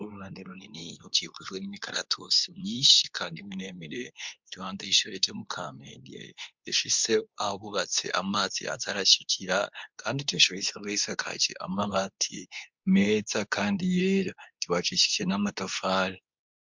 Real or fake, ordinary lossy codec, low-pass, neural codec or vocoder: fake; MP3, 64 kbps; 7.2 kHz; codec, 24 kHz, 0.9 kbps, WavTokenizer, medium speech release version 2